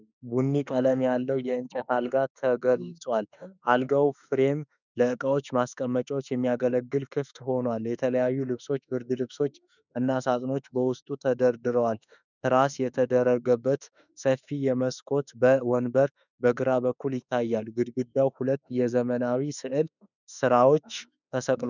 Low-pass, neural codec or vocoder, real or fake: 7.2 kHz; autoencoder, 48 kHz, 32 numbers a frame, DAC-VAE, trained on Japanese speech; fake